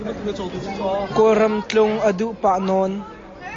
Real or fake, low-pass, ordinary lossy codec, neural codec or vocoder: real; 7.2 kHz; AAC, 32 kbps; none